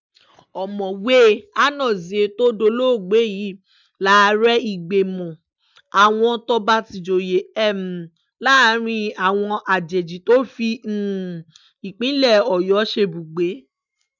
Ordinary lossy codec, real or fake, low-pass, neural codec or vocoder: none; real; 7.2 kHz; none